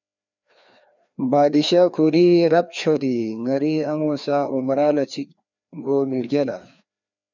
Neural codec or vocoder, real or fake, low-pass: codec, 16 kHz, 2 kbps, FreqCodec, larger model; fake; 7.2 kHz